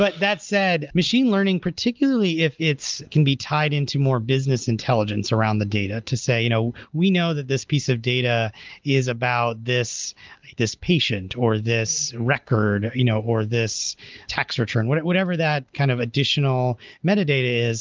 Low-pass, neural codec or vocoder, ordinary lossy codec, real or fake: 7.2 kHz; none; Opus, 24 kbps; real